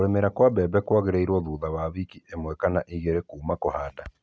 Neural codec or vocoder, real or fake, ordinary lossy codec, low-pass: none; real; none; none